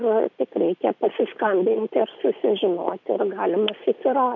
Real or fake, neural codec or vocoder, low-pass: real; none; 7.2 kHz